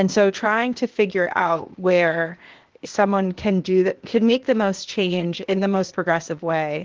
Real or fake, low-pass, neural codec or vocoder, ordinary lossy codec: fake; 7.2 kHz; codec, 16 kHz, 0.8 kbps, ZipCodec; Opus, 16 kbps